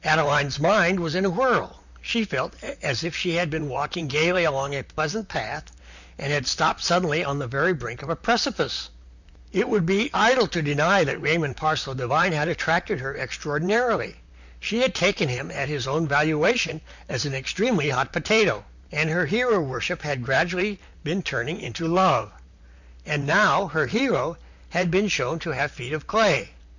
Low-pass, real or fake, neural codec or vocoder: 7.2 kHz; fake; vocoder, 44.1 kHz, 128 mel bands, Pupu-Vocoder